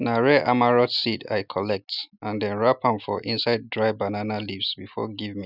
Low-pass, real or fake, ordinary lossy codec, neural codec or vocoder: 5.4 kHz; real; none; none